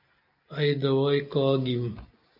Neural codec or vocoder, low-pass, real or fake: none; 5.4 kHz; real